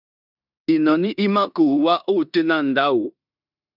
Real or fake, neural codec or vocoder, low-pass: fake; codec, 16 kHz in and 24 kHz out, 0.9 kbps, LongCat-Audio-Codec, four codebook decoder; 5.4 kHz